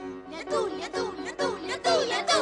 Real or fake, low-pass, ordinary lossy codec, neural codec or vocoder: real; 10.8 kHz; AAC, 64 kbps; none